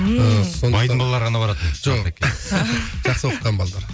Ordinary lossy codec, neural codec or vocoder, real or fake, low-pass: none; none; real; none